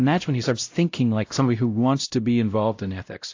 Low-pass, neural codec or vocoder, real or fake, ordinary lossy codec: 7.2 kHz; codec, 16 kHz, 0.5 kbps, X-Codec, WavLM features, trained on Multilingual LibriSpeech; fake; AAC, 48 kbps